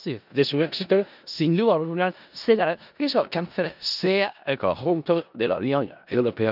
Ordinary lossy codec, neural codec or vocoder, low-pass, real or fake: none; codec, 16 kHz in and 24 kHz out, 0.4 kbps, LongCat-Audio-Codec, four codebook decoder; 5.4 kHz; fake